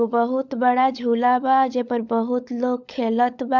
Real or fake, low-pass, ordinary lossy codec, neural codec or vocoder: fake; 7.2 kHz; none; codec, 16 kHz, 16 kbps, FunCodec, trained on LibriTTS, 50 frames a second